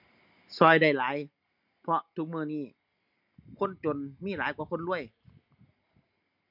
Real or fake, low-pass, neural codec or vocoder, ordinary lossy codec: real; 5.4 kHz; none; none